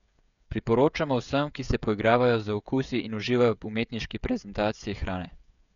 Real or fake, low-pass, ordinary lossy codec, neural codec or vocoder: fake; 7.2 kHz; none; codec, 16 kHz, 16 kbps, FreqCodec, smaller model